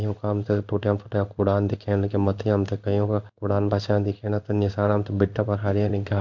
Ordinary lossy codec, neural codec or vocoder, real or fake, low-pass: none; codec, 16 kHz in and 24 kHz out, 1 kbps, XY-Tokenizer; fake; 7.2 kHz